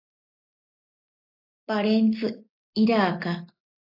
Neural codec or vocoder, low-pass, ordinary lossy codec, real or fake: none; 5.4 kHz; AAC, 48 kbps; real